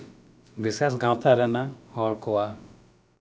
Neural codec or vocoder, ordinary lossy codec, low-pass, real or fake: codec, 16 kHz, about 1 kbps, DyCAST, with the encoder's durations; none; none; fake